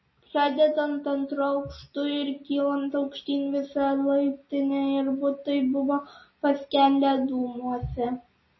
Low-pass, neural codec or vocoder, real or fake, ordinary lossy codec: 7.2 kHz; none; real; MP3, 24 kbps